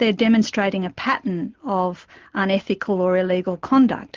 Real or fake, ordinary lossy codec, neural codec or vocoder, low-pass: real; Opus, 16 kbps; none; 7.2 kHz